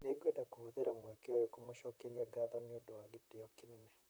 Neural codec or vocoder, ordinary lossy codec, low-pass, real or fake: vocoder, 44.1 kHz, 128 mel bands, Pupu-Vocoder; none; none; fake